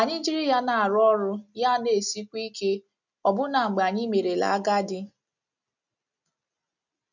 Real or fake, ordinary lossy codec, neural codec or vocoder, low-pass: real; none; none; 7.2 kHz